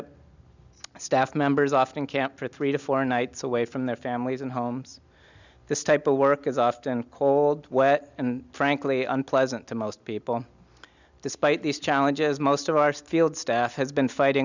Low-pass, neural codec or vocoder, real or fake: 7.2 kHz; none; real